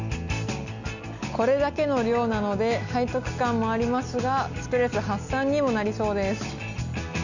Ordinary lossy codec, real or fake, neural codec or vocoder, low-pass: none; real; none; 7.2 kHz